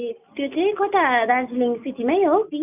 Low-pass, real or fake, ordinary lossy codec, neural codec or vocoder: 3.6 kHz; real; none; none